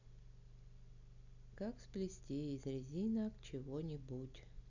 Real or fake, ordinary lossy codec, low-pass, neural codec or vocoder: real; none; 7.2 kHz; none